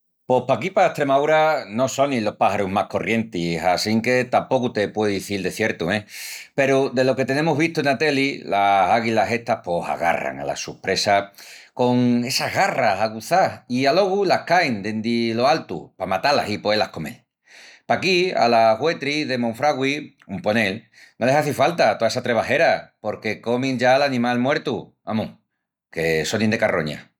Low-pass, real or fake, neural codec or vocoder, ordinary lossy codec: 19.8 kHz; real; none; none